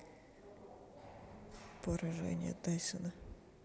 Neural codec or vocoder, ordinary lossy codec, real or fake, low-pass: none; none; real; none